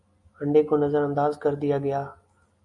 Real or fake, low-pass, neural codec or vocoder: real; 10.8 kHz; none